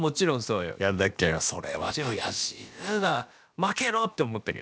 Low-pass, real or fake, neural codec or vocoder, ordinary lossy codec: none; fake; codec, 16 kHz, about 1 kbps, DyCAST, with the encoder's durations; none